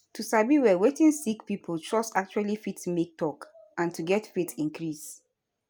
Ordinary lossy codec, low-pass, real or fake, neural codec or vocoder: none; none; real; none